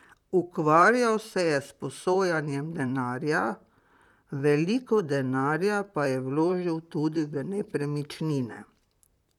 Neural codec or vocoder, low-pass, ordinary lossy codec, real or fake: vocoder, 44.1 kHz, 128 mel bands, Pupu-Vocoder; 19.8 kHz; none; fake